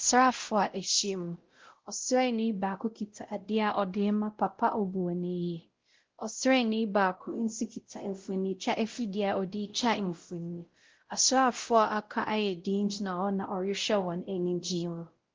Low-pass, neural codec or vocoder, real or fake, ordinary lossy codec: 7.2 kHz; codec, 16 kHz, 0.5 kbps, X-Codec, WavLM features, trained on Multilingual LibriSpeech; fake; Opus, 16 kbps